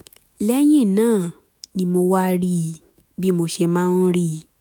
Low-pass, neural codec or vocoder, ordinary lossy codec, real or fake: none; autoencoder, 48 kHz, 128 numbers a frame, DAC-VAE, trained on Japanese speech; none; fake